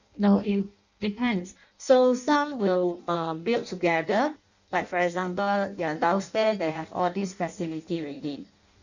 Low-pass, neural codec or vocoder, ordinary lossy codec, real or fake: 7.2 kHz; codec, 16 kHz in and 24 kHz out, 0.6 kbps, FireRedTTS-2 codec; none; fake